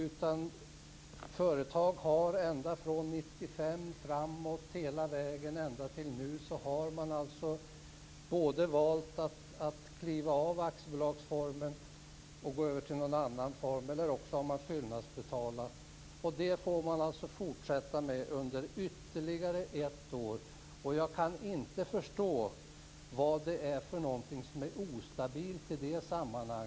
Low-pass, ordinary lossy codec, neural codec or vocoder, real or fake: none; none; none; real